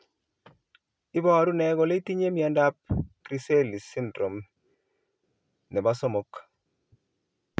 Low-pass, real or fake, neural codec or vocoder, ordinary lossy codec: none; real; none; none